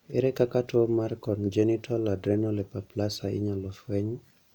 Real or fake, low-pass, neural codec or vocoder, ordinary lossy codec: real; 19.8 kHz; none; none